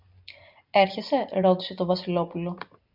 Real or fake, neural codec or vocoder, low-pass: real; none; 5.4 kHz